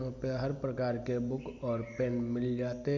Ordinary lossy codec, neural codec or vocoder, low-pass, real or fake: none; none; 7.2 kHz; real